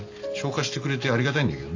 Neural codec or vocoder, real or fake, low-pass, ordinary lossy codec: none; real; 7.2 kHz; AAC, 48 kbps